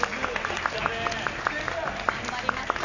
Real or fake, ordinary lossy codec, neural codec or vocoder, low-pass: real; AAC, 48 kbps; none; 7.2 kHz